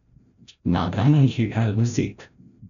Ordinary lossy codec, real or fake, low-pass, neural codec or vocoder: none; fake; 7.2 kHz; codec, 16 kHz, 0.5 kbps, FreqCodec, larger model